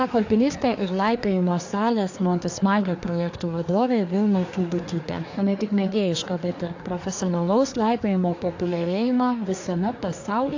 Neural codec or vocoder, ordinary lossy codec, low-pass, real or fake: codec, 24 kHz, 1 kbps, SNAC; AAC, 48 kbps; 7.2 kHz; fake